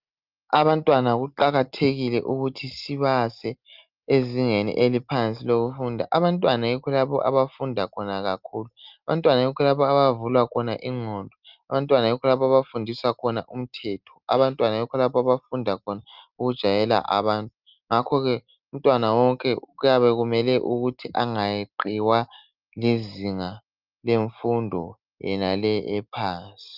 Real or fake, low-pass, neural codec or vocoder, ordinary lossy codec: real; 5.4 kHz; none; Opus, 24 kbps